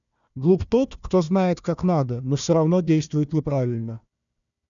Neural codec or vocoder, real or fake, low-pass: codec, 16 kHz, 1 kbps, FunCodec, trained on Chinese and English, 50 frames a second; fake; 7.2 kHz